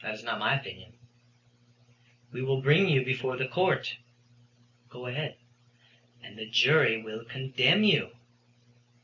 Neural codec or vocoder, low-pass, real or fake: none; 7.2 kHz; real